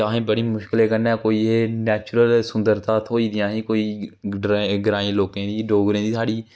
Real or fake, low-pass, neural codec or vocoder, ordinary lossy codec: real; none; none; none